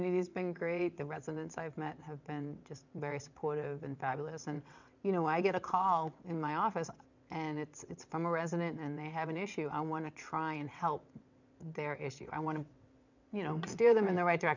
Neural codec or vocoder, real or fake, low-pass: vocoder, 22.05 kHz, 80 mel bands, WaveNeXt; fake; 7.2 kHz